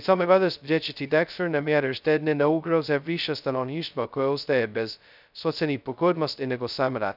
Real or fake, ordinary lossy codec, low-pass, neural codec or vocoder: fake; none; 5.4 kHz; codec, 16 kHz, 0.2 kbps, FocalCodec